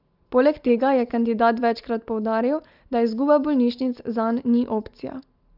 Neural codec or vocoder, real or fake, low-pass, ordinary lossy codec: none; real; 5.4 kHz; Opus, 32 kbps